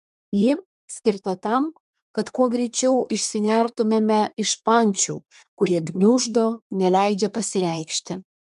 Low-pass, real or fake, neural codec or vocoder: 10.8 kHz; fake; codec, 24 kHz, 1 kbps, SNAC